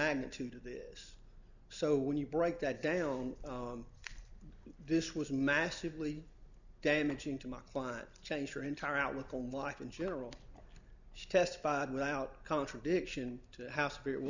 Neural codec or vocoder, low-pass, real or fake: none; 7.2 kHz; real